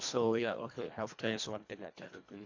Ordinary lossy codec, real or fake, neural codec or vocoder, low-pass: AAC, 48 kbps; fake; codec, 24 kHz, 1.5 kbps, HILCodec; 7.2 kHz